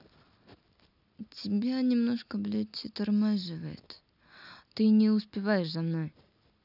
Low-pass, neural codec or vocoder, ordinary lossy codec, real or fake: 5.4 kHz; none; none; real